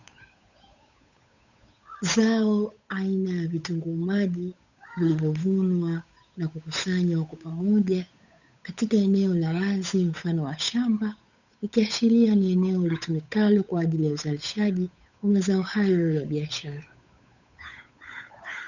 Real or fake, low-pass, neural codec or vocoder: fake; 7.2 kHz; codec, 16 kHz, 8 kbps, FunCodec, trained on Chinese and English, 25 frames a second